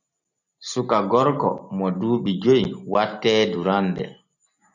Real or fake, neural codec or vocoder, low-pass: real; none; 7.2 kHz